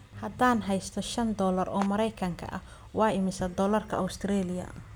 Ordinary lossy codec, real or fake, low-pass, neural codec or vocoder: none; real; none; none